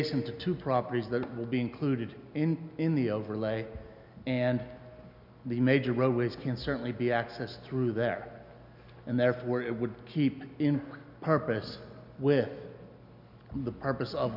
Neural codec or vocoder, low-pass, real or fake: none; 5.4 kHz; real